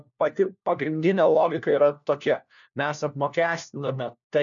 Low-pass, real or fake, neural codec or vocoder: 7.2 kHz; fake; codec, 16 kHz, 1 kbps, FunCodec, trained on LibriTTS, 50 frames a second